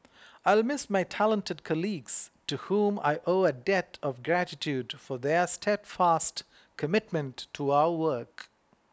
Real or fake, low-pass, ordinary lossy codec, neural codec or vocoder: real; none; none; none